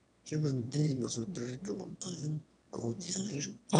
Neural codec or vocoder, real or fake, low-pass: autoencoder, 22.05 kHz, a latent of 192 numbers a frame, VITS, trained on one speaker; fake; 9.9 kHz